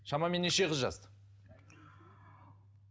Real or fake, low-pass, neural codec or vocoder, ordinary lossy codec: real; none; none; none